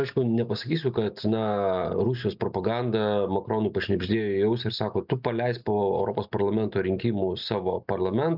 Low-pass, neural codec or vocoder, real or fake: 5.4 kHz; none; real